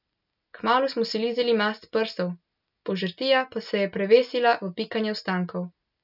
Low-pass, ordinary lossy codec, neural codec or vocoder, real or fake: 5.4 kHz; none; none; real